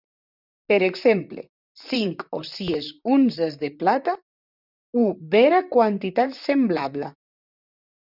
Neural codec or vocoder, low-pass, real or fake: vocoder, 44.1 kHz, 128 mel bands, Pupu-Vocoder; 5.4 kHz; fake